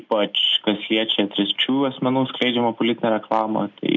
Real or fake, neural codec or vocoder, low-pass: real; none; 7.2 kHz